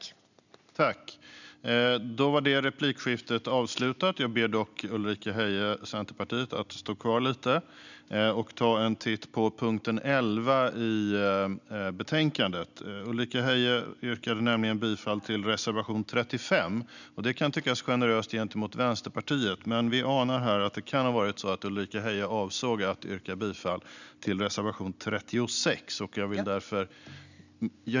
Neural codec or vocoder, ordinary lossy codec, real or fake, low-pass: none; none; real; 7.2 kHz